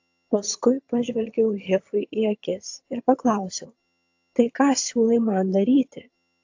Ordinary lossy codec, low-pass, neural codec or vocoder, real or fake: AAC, 48 kbps; 7.2 kHz; vocoder, 22.05 kHz, 80 mel bands, HiFi-GAN; fake